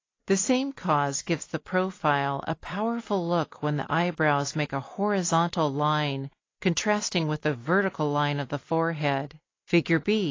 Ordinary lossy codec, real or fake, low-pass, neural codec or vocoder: AAC, 32 kbps; real; 7.2 kHz; none